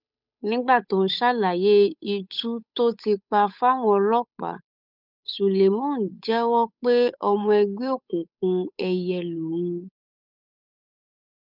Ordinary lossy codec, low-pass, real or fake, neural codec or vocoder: none; 5.4 kHz; fake; codec, 16 kHz, 8 kbps, FunCodec, trained on Chinese and English, 25 frames a second